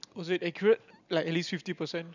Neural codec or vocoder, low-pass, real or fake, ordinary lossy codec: none; 7.2 kHz; real; none